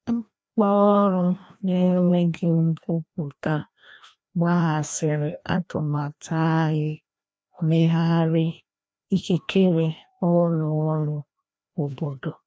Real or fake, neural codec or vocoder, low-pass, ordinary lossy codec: fake; codec, 16 kHz, 1 kbps, FreqCodec, larger model; none; none